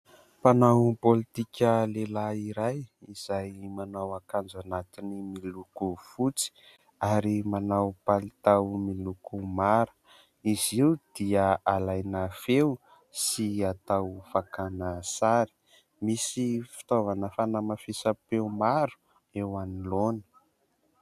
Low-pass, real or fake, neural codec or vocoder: 14.4 kHz; real; none